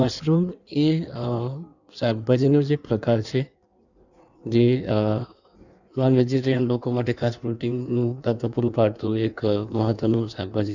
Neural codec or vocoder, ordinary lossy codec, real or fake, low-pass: codec, 16 kHz in and 24 kHz out, 1.1 kbps, FireRedTTS-2 codec; none; fake; 7.2 kHz